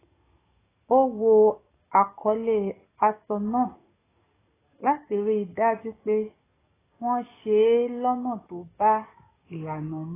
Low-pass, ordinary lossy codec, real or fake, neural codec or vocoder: 3.6 kHz; AAC, 16 kbps; fake; codec, 44.1 kHz, 7.8 kbps, DAC